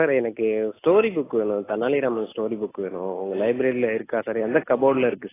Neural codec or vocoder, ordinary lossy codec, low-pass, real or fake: none; AAC, 16 kbps; 3.6 kHz; real